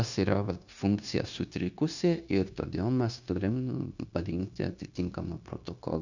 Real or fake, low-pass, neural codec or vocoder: fake; 7.2 kHz; codec, 16 kHz, 0.9 kbps, LongCat-Audio-Codec